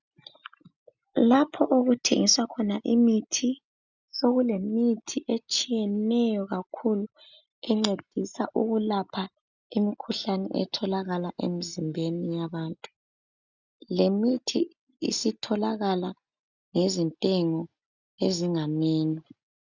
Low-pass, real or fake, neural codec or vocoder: 7.2 kHz; real; none